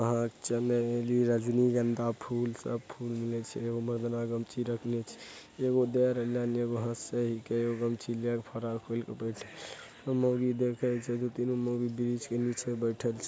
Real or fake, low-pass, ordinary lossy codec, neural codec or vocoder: real; none; none; none